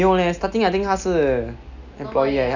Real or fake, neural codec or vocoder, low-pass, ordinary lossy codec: real; none; 7.2 kHz; none